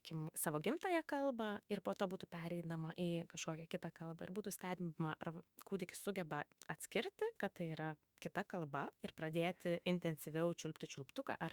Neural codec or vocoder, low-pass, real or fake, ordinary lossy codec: autoencoder, 48 kHz, 32 numbers a frame, DAC-VAE, trained on Japanese speech; 19.8 kHz; fake; Opus, 64 kbps